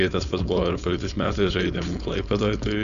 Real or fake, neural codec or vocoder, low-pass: fake; codec, 16 kHz, 4.8 kbps, FACodec; 7.2 kHz